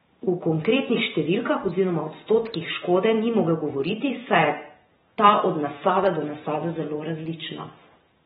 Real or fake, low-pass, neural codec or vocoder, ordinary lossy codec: fake; 19.8 kHz; vocoder, 44.1 kHz, 128 mel bands every 512 samples, BigVGAN v2; AAC, 16 kbps